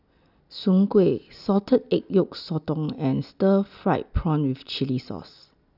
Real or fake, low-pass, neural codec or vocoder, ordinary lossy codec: real; 5.4 kHz; none; none